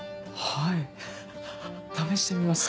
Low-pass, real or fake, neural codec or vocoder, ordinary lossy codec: none; real; none; none